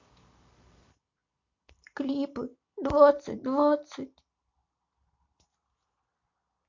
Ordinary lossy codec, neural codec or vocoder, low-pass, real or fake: MP3, 48 kbps; none; 7.2 kHz; real